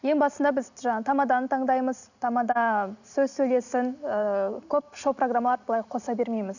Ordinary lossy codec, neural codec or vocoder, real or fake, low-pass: none; none; real; 7.2 kHz